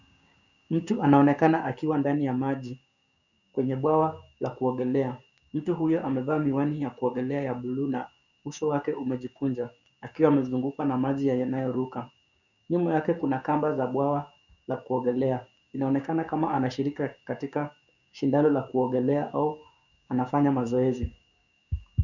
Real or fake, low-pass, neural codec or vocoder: fake; 7.2 kHz; codec, 16 kHz, 6 kbps, DAC